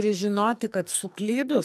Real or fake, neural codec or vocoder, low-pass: fake; codec, 44.1 kHz, 2.6 kbps, SNAC; 14.4 kHz